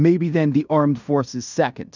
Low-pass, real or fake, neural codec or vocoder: 7.2 kHz; fake; codec, 16 kHz in and 24 kHz out, 0.9 kbps, LongCat-Audio-Codec, fine tuned four codebook decoder